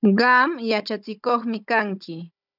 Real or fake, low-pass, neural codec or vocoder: fake; 5.4 kHz; codec, 16 kHz, 16 kbps, FunCodec, trained on Chinese and English, 50 frames a second